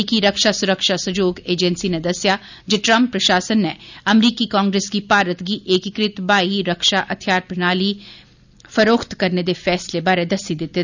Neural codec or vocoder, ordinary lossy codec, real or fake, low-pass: none; none; real; 7.2 kHz